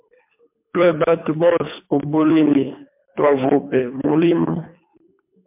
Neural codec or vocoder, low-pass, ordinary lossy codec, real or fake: codec, 24 kHz, 3 kbps, HILCodec; 3.6 kHz; MP3, 32 kbps; fake